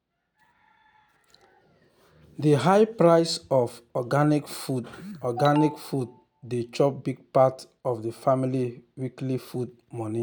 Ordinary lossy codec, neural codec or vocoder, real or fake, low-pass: none; none; real; none